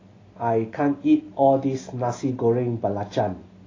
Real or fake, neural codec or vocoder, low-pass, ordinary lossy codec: real; none; 7.2 kHz; AAC, 32 kbps